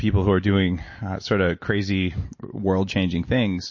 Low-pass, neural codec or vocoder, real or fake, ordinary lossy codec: 7.2 kHz; none; real; MP3, 32 kbps